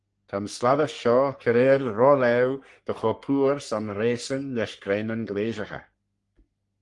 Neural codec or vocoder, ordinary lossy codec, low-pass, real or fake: codec, 44.1 kHz, 3.4 kbps, Pupu-Codec; Opus, 24 kbps; 10.8 kHz; fake